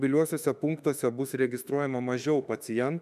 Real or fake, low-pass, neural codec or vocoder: fake; 14.4 kHz; autoencoder, 48 kHz, 32 numbers a frame, DAC-VAE, trained on Japanese speech